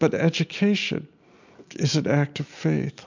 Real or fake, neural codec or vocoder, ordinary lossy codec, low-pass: real; none; MP3, 64 kbps; 7.2 kHz